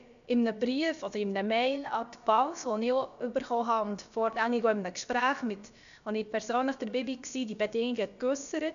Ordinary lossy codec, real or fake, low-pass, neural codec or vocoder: none; fake; 7.2 kHz; codec, 16 kHz, about 1 kbps, DyCAST, with the encoder's durations